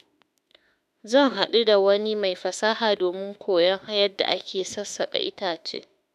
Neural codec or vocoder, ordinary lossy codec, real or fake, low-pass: autoencoder, 48 kHz, 32 numbers a frame, DAC-VAE, trained on Japanese speech; none; fake; 14.4 kHz